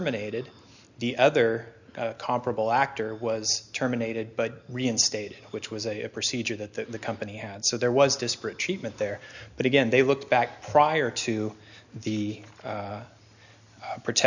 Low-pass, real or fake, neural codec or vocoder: 7.2 kHz; real; none